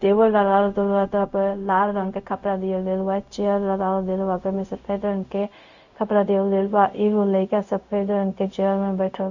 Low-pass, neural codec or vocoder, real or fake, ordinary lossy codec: 7.2 kHz; codec, 16 kHz, 0.4 kbps, LongCat-Audio-Codec; fake; none